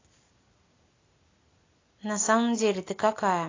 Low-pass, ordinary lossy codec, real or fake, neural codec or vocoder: 7.2 kHz; AAC, 32 kbps; real; none